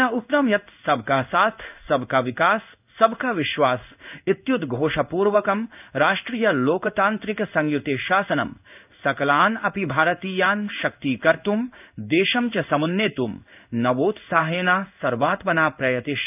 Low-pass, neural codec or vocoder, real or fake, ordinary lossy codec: 3.6 kHz; codec, 16 kHz in and 24 kHz out, 1 kbps, XY-Tokenizer; fake; none